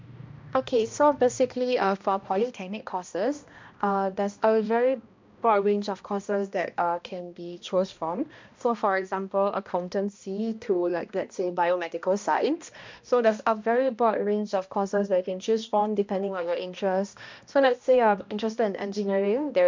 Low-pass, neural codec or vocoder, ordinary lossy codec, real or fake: 7.2 kHz; codec, 16 kHz, 1 kbps, X-Codec, HuBERT features, trained on balanced general audio; MP3, 64 kbps; fake